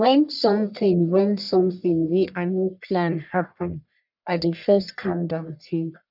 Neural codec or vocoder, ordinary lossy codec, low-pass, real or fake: codec, 44.1 kHz, 1.7 kbps, Pupu-Codec; none; 5.4 kHz; fake